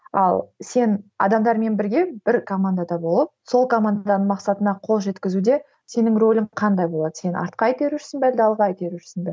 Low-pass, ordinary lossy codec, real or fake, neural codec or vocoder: none; none; real; none